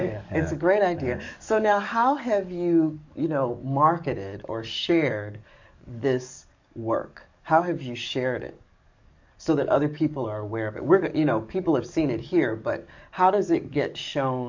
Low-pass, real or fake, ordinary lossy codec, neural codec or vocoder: 7.2 kHz; fake; MP3, 64 kbps; codec, 44.1 kHz, 7.8 kbps, DAC